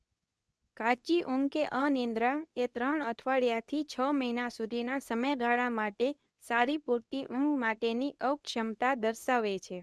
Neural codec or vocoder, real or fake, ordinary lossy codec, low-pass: codec, 24 kHz, 0.9 kbps, WavTokenizer, medium speech release version 2; fake; none; none